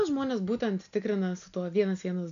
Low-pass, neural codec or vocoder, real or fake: 7.2 kHz; none; real